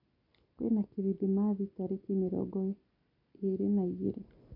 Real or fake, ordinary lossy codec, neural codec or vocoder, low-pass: real; none; none; 5.4 kHz